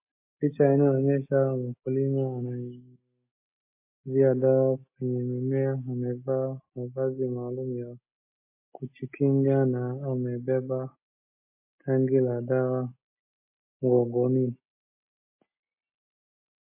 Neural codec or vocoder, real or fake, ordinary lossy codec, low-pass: none; real; MP3, 24 kbps; 3.6 kHz